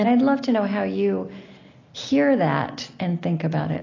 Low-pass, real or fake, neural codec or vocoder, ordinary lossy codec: 7.2 kHz; real; none; AAC, 32 kbps